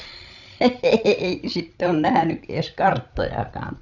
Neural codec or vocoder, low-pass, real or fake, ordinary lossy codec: codec, 16 kHz, 16 kbps, FreqCodec, larger model; 7.2 kHz; fake; none